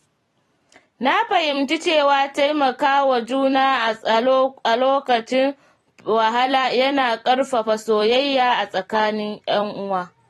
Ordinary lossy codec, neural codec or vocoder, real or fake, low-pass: AAC, 32 kbps; vocoder, 44.1 kHz, 128 mel bands every 256 samples, BigVGAN v2; fake; 19.8 kHz